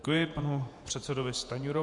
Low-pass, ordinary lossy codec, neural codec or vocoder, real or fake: 10.8 kHz; MP3, 64 kbps; vocoder, 48 kHz, 128 mel bands, Vocos; fake